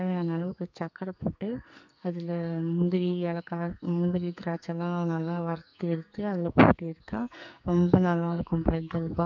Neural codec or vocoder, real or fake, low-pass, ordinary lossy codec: codec, 44.1 kHz, 2.6 kbps, SNAC; fake; 7.2 kHz; none